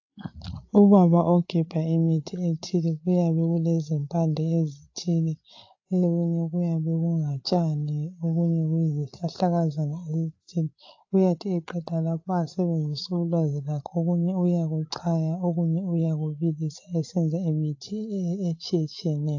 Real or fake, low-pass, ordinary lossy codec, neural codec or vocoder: fake; 7.2 kHz; AAC, 48 kbps; codec, 16 kHz, 8 kbps, FreqCodec, larger model